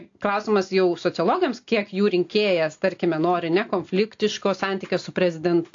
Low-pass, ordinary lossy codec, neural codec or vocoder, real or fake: 7.2 kHz; AAC, 48 kbps; none; real